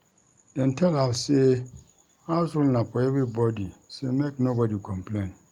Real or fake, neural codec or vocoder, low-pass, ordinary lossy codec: real; none; 19.8 kHz; Opus, 32 kbps